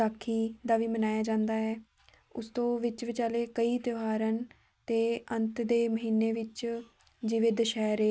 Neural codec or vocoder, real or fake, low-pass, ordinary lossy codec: none; real; none; none